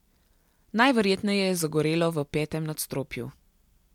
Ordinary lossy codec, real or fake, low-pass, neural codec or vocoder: MP3, 96 kbps; real; 19.8 kHz; none